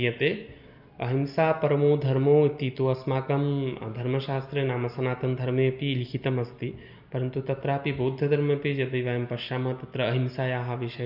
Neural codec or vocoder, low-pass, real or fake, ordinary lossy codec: none; 5.4 kHz; real; none